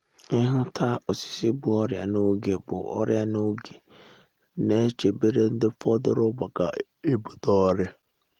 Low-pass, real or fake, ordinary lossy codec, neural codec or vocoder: 19.8 kHz; real; Opus, 24 kbps; none